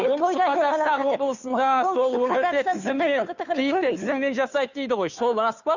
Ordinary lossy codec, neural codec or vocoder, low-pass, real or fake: none; codec, 16 kHz, 2 kbps, FunCodec, trained on Chinese and English, 25 frames a second; 7.2 kHz; fake